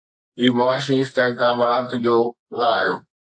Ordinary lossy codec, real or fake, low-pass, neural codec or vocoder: AAC, 48 kbps; fake; 9.9 kHz; codec, 24 kHz, 0.9 kbps, WavTokenizer, medium music audio release